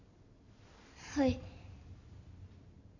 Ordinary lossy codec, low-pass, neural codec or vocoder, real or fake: none; 7.2 kHz; none; real